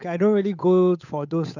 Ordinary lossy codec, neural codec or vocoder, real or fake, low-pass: none; codec, 16 kHz, 8 kbps, FunCodec, trained on Chinese and English, 25 frames a second; fake; 7.2 kHz